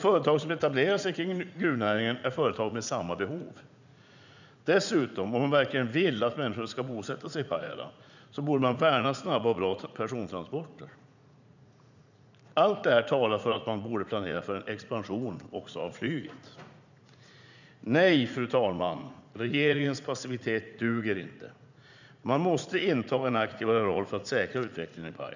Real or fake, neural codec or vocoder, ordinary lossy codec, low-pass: fake; vocoder, 44.1 kHz, 80 mel bands, Vocos; none; 7.2 kHz